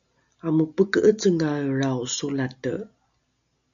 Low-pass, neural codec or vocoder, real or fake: 7.2 kHz; none; real